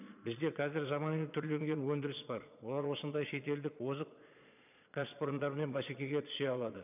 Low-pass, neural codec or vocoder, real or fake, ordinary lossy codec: 3.6 kHz; vocoder, 22.05 kHz, 80 mel bands, Vocos; fake; none